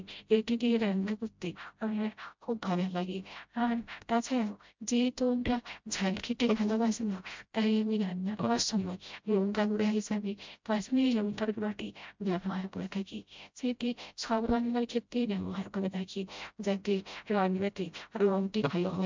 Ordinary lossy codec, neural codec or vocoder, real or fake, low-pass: MP3, 64 kbps; codec, 16 kHz, 0.5 kbps, FreqCodec, smaller model; fake; 7.2 kHz